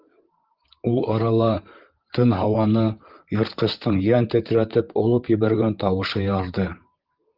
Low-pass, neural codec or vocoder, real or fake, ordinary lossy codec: 5.4 kHz; vocoder, 44.1 kHz, 128 mel bands, Pupu-Vocoder; fake; Opus, 32 kbps